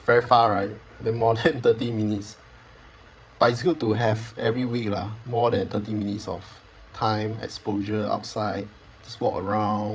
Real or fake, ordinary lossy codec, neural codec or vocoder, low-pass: fake; none; codec, 16 kHz, 8 kbps, FreqCodec, larger model; none